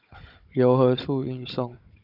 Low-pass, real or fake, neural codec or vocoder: 5.4 kHz; fake; codec, 16 kHz, 16 kbps, FunCodec, trained on Chinese and English, 50 frames a second